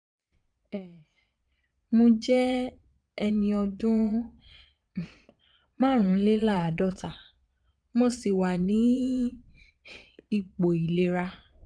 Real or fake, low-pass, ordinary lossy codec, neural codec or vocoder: fake; 9.9 kHz; none; vocoder, 22.05 kHz, 80 mel bands, Vocos